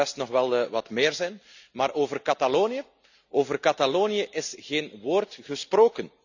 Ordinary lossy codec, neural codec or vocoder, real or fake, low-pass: none; none; real; 7.2 kHz